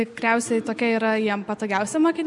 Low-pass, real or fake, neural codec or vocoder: 10.8 kHz; real; none